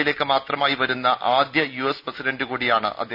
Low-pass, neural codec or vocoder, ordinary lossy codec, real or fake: 5.4 kHz; none; none; real